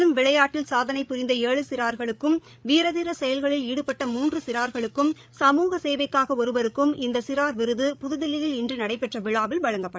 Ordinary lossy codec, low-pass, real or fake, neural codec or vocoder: none; none; fake; codec, 16 kHz, 8 kbps, FreqCodec, larger model